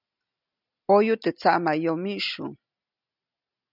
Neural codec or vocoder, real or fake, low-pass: none; real; 5.4 kHz